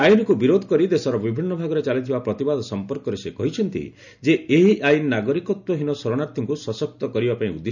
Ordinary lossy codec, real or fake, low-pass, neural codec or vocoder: none; real; 7.2 kHz; none